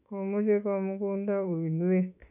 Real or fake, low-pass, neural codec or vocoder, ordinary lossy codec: fake; 3.6 kHz; autoencoder, 48 kHz, 32 numbers a frame, DAC-VAE, trained on Japanese speech; none